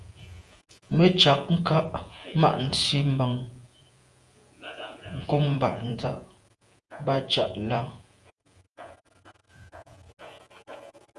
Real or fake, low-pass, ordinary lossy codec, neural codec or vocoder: fake; 10.8 kHz; Opus, 32 kbps; vocoder, 48 kHz, 128 mel bands, Vocos